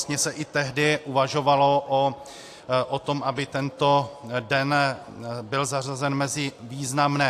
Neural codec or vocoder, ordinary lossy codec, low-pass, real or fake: none; AAC, 64 kbps; 14.4 kHz; real